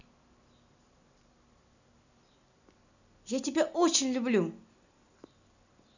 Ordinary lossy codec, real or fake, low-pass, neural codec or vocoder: none; real; 7.2 kHz; none